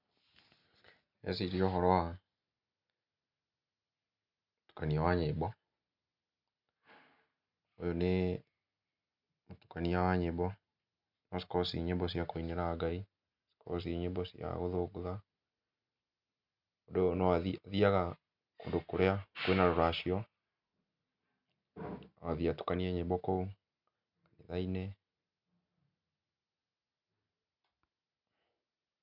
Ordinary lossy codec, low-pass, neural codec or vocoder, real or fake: none; 5.4 kHz; none; real